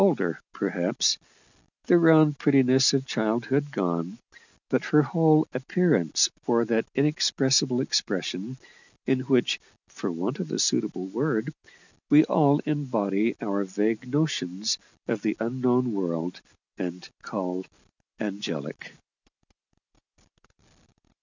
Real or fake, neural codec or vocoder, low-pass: real; none; 7.2 kHz